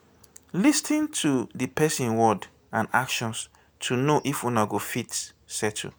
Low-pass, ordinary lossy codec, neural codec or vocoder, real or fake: none; none; none; real